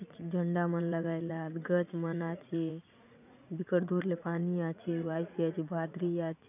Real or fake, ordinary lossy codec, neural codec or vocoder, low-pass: fake; none; vocoder, 44.1 kHz, 80 mel bands, Vocos; 3.6 kHz